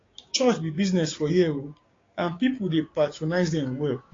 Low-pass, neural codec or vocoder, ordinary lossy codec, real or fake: 7.2 kHz; codec, 16 kHz, 6 kbps, DAC; AAC, 32 kbps; fake